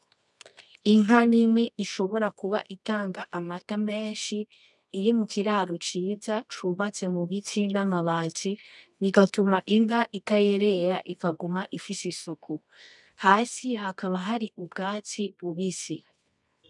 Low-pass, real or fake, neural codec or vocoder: 10.8 kHz; fake; codec, 24 kHz, 0.9 kbps, WavTokenizer, medium music audio release